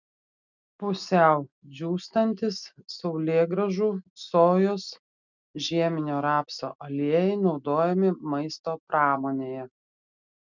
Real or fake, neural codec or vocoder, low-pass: real; none; 7.2 kHz